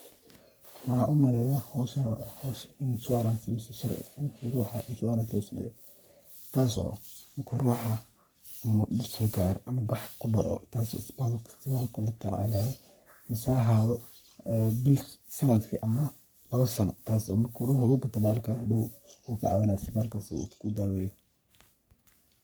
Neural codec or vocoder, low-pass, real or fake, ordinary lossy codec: codec, 44.1 kHz, 3.4 kbps, Pupu-Codec; none; fake; none